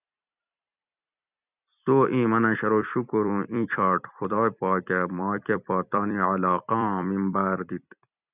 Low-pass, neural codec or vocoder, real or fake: 3.6 kHz; vocoder, 44.1 kHz, 128 mel bands every 256 samples, BigVGAN v2; fake